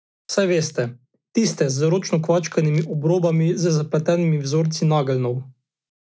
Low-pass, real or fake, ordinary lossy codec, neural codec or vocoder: none; real; none; none